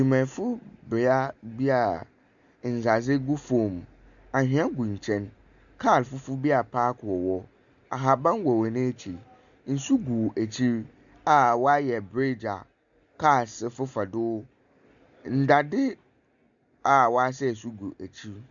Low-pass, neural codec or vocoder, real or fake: 7.2 kHz; none; real